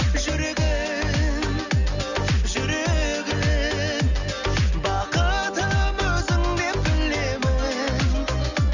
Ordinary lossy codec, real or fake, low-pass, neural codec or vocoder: none; real; 7.2 kHz; none